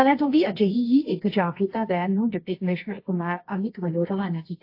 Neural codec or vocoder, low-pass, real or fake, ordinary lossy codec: codec, 24 kHz, 0.9 kbps, WavTokenizer, medium music audio release; 5.4 kHz; fake; AAC, 32 kbps